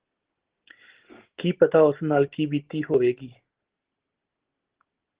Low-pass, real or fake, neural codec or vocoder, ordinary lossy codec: 3.6 kHz; real; none; Opus, 16 kbps